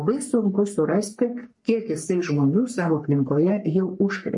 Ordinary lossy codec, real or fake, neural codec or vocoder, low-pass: MP3, 48 kbps; fake; codec, 44.1 kHz, 3.4 kbps, Pupu-Codec; 10.8 kHz